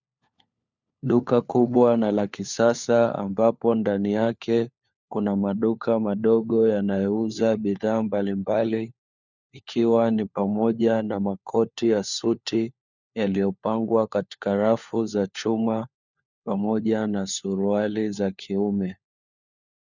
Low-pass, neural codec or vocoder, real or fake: 7.2 kHz; codec, 16 kHz, 4 kbps, FunCodec, trained on LibriTTS, 50 frames a second; fake